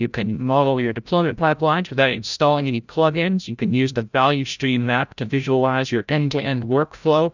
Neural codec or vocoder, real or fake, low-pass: codec, 16 kHz, 0.5 kbps, FreqCodec, larger model; fake; 7.2 kHz